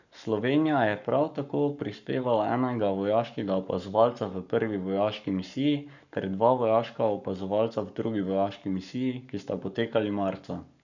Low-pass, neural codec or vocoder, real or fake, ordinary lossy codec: 7.2 kHz; codec, 44.1 kHz, 7.8 kbps, Pupu-Codec; fake; none